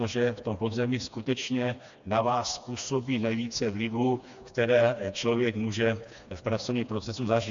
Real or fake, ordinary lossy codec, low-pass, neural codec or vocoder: fake; AAC, 64 kbps; 7.2 kHz; codec, 16 kHz, 2 kbps, FreqCodec, smaller model